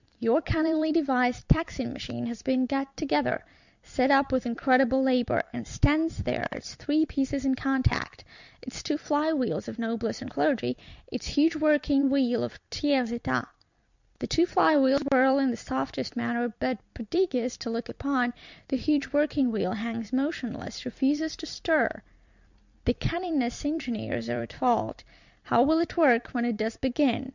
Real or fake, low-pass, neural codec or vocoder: fake; 7.2 kHz; vocoder, 44.1 kHz, 128 mel bands every 256 samples, BigVGAN v2